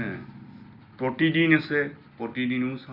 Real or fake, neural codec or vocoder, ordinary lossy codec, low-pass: real; none; Opus, 64 kbps; 5.4 kHz